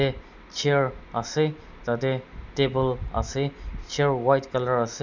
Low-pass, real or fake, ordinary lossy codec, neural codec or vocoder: 7.2 kHz; real; none; none